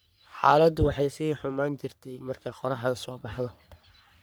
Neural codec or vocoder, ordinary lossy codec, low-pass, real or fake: codec, 44.1 kHz, 3.4 kbps, Pupu-Codec; none; none; fake